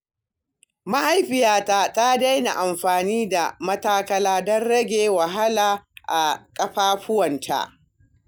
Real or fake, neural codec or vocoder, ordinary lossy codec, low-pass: real; none; none; none